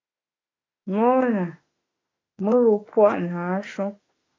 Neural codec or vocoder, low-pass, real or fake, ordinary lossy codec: autoencoder, 48 kHz, 32 numbers a frame, DAC-VAE, trained on Japanese speech; 7.2 kHz; fake; AAC, 32 kbps